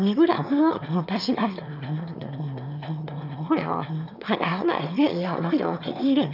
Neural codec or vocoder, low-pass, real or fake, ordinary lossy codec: autoencoder, 22.05 kHz, a latent of 192 numbers a frame, VITS, trained on one speaker; 5.4 kHz; fake; none